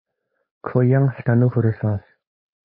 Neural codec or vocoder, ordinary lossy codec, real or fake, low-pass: codec, 16 kHz, 4.8 kbps, FACodec; MP3, 24 kbps; fake; 5.4 kHz